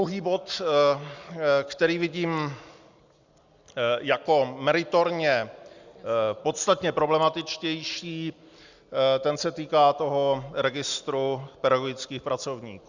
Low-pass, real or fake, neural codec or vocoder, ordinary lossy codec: 7.2 kHz; real; none; Opus, 64 kbps